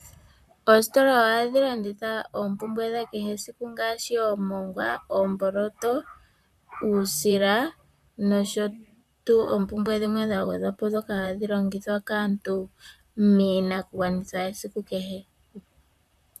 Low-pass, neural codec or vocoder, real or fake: 14.4 kHz; vocoder, 44.1 kHz, 128 mel bands, Pupu-Vocoder; fake